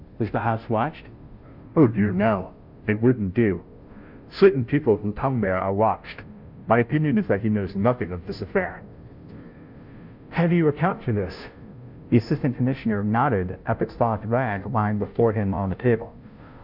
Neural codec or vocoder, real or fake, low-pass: codec, 16 kHz, 0.5 kbps, FunCodec, trained on Chinese and English, 25 frames a second; fake; 5.4 kHz